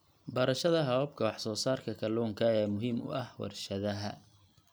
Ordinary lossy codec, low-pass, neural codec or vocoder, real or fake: none; none; none; real